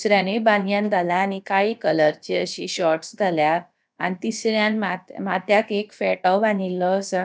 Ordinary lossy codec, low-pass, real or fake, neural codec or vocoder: none; none; fake; codec, 16 kHz, about 1 kbps, DyCAST, with the encoder's durations